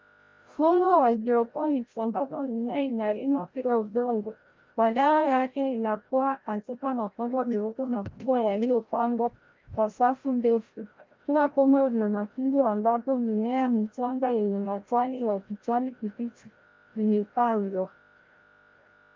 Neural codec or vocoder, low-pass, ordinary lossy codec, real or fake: codec, 16 kHz, 0.5 kbps, FreqCodec, larger model; 7.2 kHz; Opus, 32 kbps; fake